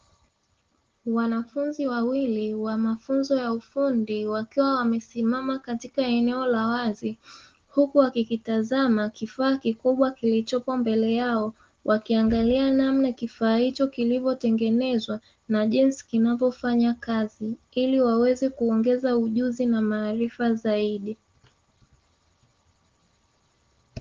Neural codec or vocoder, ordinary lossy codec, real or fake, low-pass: none; Opus, 16 kbps; real; 7.2 kHz